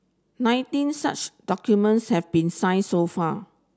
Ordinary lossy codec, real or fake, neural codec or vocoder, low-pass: none; real; none; none